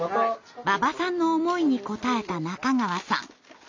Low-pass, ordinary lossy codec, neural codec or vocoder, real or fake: 7.2 kHz; MP3, 64 kbps; none; real